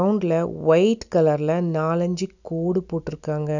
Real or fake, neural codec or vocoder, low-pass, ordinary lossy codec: real; none; 7.2 kHz; none